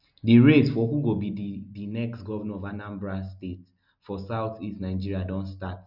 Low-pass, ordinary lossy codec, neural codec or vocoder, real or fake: 5.4 kHz; none; none; real